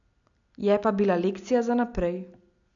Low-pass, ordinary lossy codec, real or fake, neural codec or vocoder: 7.2 kHz; none; real; none